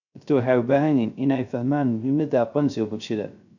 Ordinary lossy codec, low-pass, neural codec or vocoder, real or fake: none; 7.2 kHz; codec, 16 kHz, 0.3 kbps, FocalCodec; fake